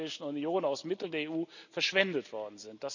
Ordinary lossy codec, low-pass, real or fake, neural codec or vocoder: none; 7.2 kHz; real; none